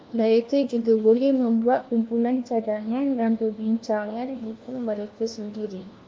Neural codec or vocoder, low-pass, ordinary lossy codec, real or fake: codec, 16 kHz, 1 kbps, FunCodec, trained on LibriTTS, 50 frames a second; 7.2 kHz; Opus, 24 kbps; fake